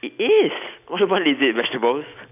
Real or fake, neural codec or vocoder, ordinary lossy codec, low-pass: real; none; none; 3.6 kHz